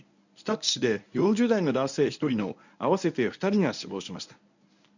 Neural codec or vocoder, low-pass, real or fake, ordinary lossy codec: codec, 24 kHz, 0.9 kbps, WavTokenizer, medium speech release version 1; 7.2 kHz; fake; none